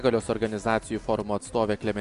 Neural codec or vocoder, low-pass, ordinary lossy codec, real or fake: none; 10.8 kHz; AAC, 96 kbps; real